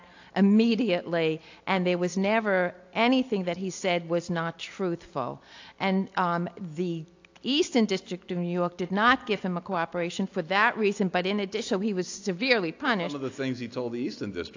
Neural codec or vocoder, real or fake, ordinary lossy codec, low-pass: none; real; AAC, 48 kbps; 7.2 kHz